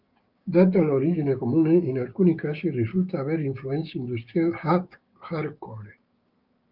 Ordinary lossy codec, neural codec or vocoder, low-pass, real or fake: Opus, 32 kbps; codec, 16 kHz, 6 kbps, DAC; 5.4 kHz; fake